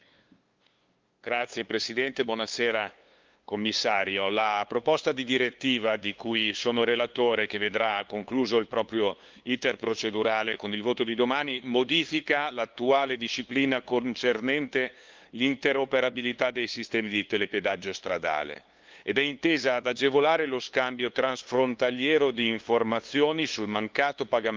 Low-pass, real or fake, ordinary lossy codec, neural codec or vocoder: 7.2 kHz; fake; Opus, 32 kbps; codec, 16 kHz, 2 kbps, FunCodec, trained on LibriTTS, 25 frames a second